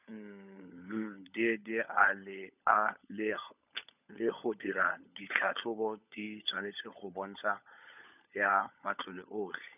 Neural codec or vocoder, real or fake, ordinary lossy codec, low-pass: codec, 16 kHz, 16 kbps, FunCodec, trained on LibriTTS, 50 frames a second; fake; none; 3.6 kHz